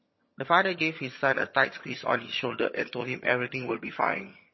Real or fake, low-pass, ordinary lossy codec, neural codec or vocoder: fake; 7.2 kHz; MP3, 24 kbps; vocoder, 22.05 kHz, 80 mel bands, HiFi-GAN